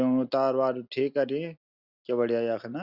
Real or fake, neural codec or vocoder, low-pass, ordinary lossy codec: real; none; 5.4 kHz; Opus, 64 kbps